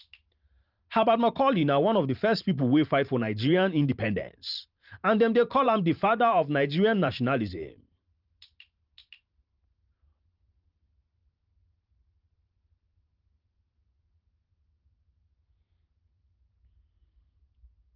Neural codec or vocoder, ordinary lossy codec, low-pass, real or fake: vocoder, 44.1 kHz, 128 mel bands every 512 samples, BigVGAN v2; Opus, 24 kbps; 5.4 kHz; fake